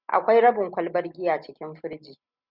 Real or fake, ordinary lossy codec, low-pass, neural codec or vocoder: real; Opus, 64 kbps; 5.4 kHz; none